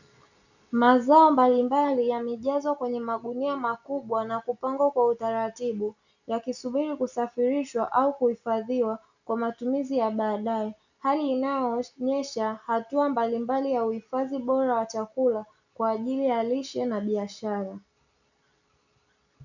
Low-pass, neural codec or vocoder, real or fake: 7.2 kHz; none; real